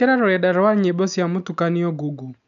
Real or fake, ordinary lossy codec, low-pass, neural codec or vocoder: real; none; 7.2 kHz; none